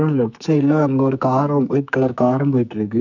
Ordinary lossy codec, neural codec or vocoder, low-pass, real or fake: none; codec, 44.1 kHz, 2.6 kbps, SNAC; 7.2 kHz; fake